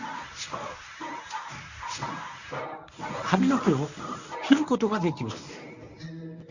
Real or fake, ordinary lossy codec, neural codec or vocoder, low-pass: fake; none; codec, 24 kHz, 0.9 kbps, WavTokenizer, medium speech release version 1; 7.2 kHz